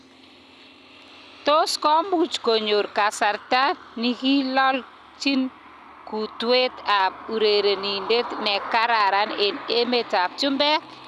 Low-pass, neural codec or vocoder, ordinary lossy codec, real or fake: 14.4 kHz; none; none; real